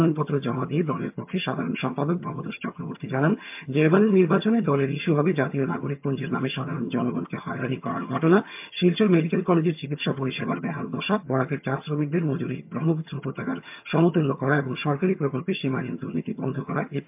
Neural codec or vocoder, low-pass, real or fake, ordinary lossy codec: vocoder, 22.05 kHz, 80 mel bands, HiFi-GAN; 3.6 kHz; fake; MP3, 32 kbps